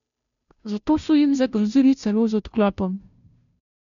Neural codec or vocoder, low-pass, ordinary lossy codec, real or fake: codec, 16 kHz, 0.5 kbps, FunCodec, trained on Chinese and English, 25 frames a second; 7.2 kHz; none; fake